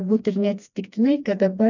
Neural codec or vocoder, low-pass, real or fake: codec, 16 kHz, 2 kbps, FreqCodec, smaller model; 7.2 kHz; fake